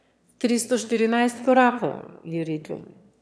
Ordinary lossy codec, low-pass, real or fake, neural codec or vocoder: none; none; fake; autoencoder, 22.05 kHz, a latent of 192 numbers a frame, VITS, trained on one speaker